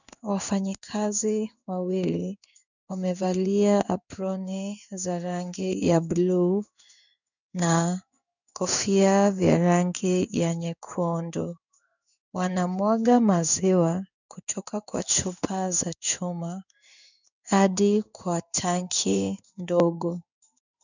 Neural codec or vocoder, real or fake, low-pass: codec, 16 kHz in and 24 kHz out, 1 kbps, XY-Tokenizer; fake; 7.2 kHz